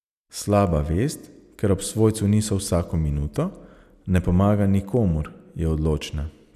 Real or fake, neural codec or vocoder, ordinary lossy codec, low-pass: real; none; none; 14.4 kHz